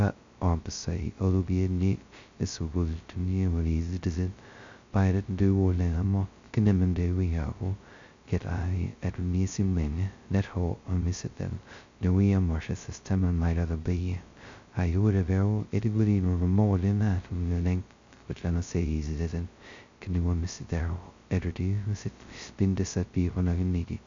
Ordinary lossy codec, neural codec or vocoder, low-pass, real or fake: MP3, 64 kbps; codec, 16 kHz, 0.2 kbps, FocalCodec; 7.2 kHz; fake